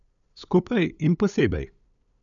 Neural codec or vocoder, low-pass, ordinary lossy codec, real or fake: codec, 16 kHz, 8 kbps, FunCodec, trained on LibriTTS, 25 frames a second; 7.2 kHz; none; fake